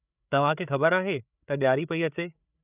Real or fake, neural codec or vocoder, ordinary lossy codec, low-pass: fake; codec, 16 kHz, 8 kbps, FreqCodec, larger model; none; 3.6 kHz